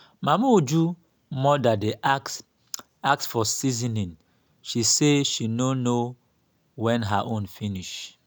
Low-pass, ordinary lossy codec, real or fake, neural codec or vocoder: none; none; real; none